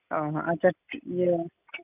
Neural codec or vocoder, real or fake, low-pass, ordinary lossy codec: none; real; 3.6 kHz; none